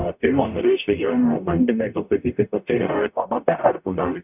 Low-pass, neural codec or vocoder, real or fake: 3.6 kHz; codec, 44.1 kHz, 0.9 kbps, DAC; fake